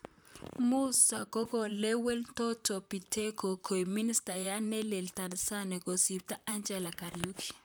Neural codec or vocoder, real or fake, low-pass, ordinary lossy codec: vocoder, 44.1 kHz, 128 mel bands, Pupu-Vocoder; fake; none; none